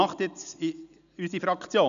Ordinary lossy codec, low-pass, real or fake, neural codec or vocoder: none; 7.2 kHz; real; none